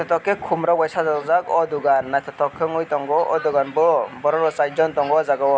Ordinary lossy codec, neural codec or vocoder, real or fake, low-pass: none; none; real; none